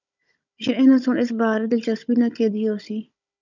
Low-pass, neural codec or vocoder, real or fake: 7.2 kHz; codec, 16 kHz, 16 kbps, FunCodec, trained on Chinese and English, 50 frames a second; fake